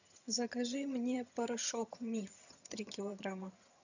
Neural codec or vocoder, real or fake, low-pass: vocoder, 22.05 kHz, 80 mel bands, HiFi-GAN; fake; 7.2 kHz